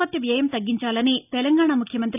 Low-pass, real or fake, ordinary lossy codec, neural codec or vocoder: 3.6 kHz; real; none; none